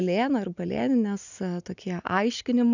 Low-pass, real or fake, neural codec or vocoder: 7.2 kHz; real; none